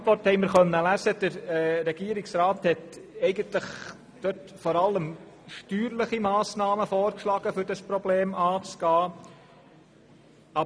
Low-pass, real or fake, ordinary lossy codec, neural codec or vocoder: none; real; none; none